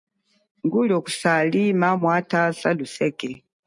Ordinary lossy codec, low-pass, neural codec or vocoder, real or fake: MP3, 64 kbps; 10.8 kHz; none; real